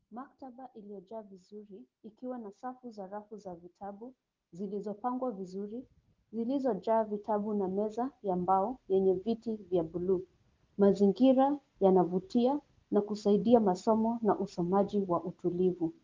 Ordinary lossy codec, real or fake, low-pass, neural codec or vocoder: Opus, 32 kbps; real; 7.2 kHz; none